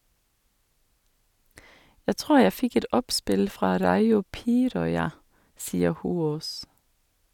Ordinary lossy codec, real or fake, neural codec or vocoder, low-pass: none; real; none; 19.8 kHz